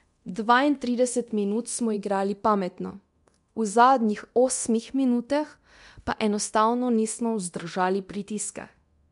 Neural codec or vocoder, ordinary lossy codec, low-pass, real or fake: codec, 24 kHz, 0.9 kbps, DualCodec; MP3, 64 kbps; 10.8 kHz; fake